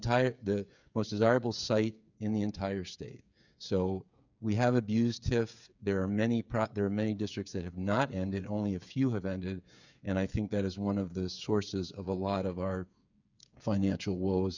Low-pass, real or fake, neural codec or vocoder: 7.2 kHz; fake; codec, 16 kHz, 8 kbps, FreqCodec, smaller model